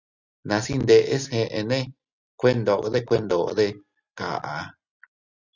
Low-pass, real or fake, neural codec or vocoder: 7.2 kHz; real; none